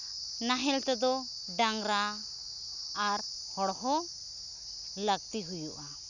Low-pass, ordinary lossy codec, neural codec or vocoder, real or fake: 7.2 kHz; none; none; real